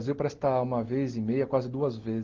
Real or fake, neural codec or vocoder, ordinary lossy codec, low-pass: real; none; Opus, 16 kbps; 7.2 kHz